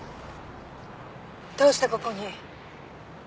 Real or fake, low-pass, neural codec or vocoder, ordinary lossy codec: real; none; none; none